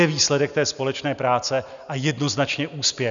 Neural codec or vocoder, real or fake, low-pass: none; real; 7.2 kHz